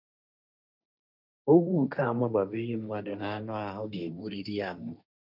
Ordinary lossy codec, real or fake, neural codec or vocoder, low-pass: none; fake; codec, 16 kHz, 1.1 kbps, Voila-Tokenizer; 5.4 kHz